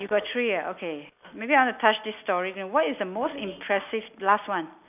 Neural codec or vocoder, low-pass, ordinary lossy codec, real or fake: none; 3.6 kHz; none; real